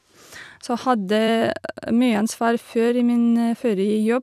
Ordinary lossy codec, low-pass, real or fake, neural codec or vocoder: none; 14.4 kHz; fake; vocoder, 44.1 kHz, 128 mel bands every 256 samples, BigVGAN v2